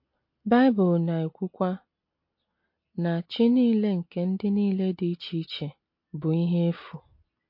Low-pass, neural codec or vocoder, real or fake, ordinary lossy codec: 5.4 kHz; none; real; MP3, 32 kbps